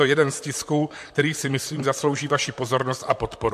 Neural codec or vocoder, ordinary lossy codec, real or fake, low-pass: vocoder, 44.1 kHz, 128 mel bands, Pupu-Vocoder; MP3, 64 kbps; fake; 14.4 kHz